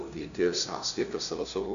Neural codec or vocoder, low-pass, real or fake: codec, 16 kHz, 0.5 kbps, FunCodec, trained on LibriTTS, 25 frames a second; 7.2 kHz; fake